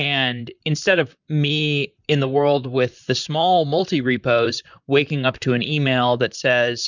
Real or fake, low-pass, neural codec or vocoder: fake; 7.2 kHz; vocoder, 44.1 kHz, 128 mel bands, Pupu-Vocoder